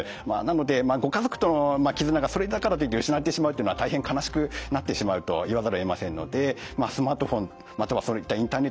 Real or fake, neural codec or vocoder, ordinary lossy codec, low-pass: real; none; none; none